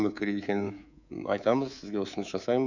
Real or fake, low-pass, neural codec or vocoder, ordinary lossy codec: fake; 7.2 kHz; codec, 24 kHz, 3.1 kbps, DualCodec; none